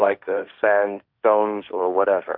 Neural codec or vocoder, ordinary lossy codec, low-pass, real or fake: codec, 16 kHz, 1.1 kbps, Voila-Tokenizer; Opus, 64 kbps; 5.4 kHz; fake